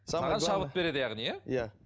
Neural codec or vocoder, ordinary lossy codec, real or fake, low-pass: none; none; real; none